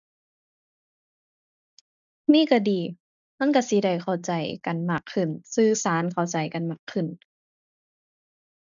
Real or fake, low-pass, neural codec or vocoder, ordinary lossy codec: real; 7.2 kHz; none; none